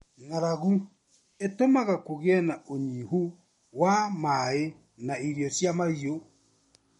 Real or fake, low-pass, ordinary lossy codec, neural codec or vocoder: real; 19.8 kHz; MP3, 48 kbps; none